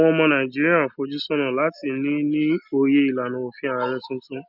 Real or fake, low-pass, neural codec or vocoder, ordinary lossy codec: real; 5.4 kHz; none; none